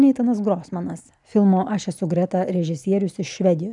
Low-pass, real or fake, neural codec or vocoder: 10.8 kHz; real; none